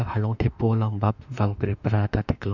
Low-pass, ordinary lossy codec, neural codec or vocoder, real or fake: 7.2 kHz; none; autoencoder, 48 kHz, 32 numbers a frame, DAC-VAE, trained on Japanese speech; fake